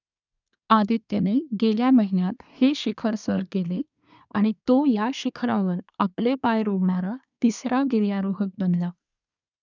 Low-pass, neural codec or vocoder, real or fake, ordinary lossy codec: 7.2 kHz; codec, 24 kHz, 1 kbps, SNAC; fake; none